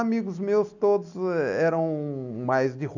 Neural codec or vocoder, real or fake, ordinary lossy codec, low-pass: none; real; none; 7.2 kHz